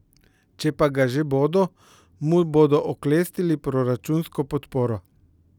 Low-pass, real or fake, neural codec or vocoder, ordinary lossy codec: 19.8 kHz; real; none; none